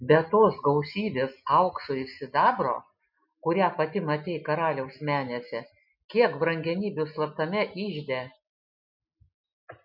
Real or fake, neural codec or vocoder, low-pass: real; none; 5.4 kHz